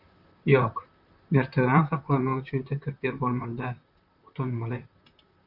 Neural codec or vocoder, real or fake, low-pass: vocoder, 44.1 kHz, 128 mel bands, Pupu-Vocoder; fake; 5.4 kHz